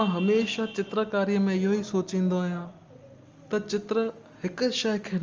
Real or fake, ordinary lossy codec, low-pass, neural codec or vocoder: real; Opus, 32 kbps; 7.2 kHz; none